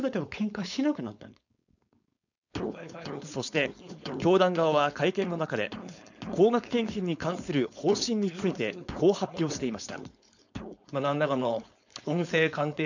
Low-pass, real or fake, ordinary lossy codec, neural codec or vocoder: 7.2 kHz; fake; none; codec, 16 kHz, 4.8 kbps, FACodec